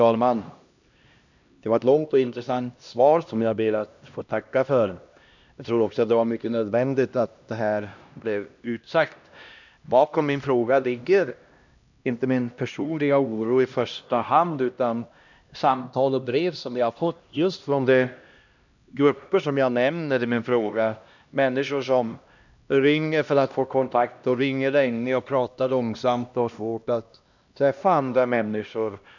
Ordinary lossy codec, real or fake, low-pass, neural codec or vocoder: none; fake; 7.2 kHz; codec, 16 kHz, 1 kbps, X-Codec, HuBERT features, trained on LibriSpeech